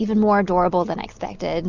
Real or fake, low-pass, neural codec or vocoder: real; 7.2 kHz; none